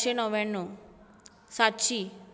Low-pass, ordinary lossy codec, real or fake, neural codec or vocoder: none; none; real; none